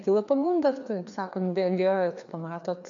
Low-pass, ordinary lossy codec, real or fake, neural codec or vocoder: 7.2 kHz; AAC, 64 kbps; fake; codec, 16 kHz, 2 kbps, FreqCodec, larger model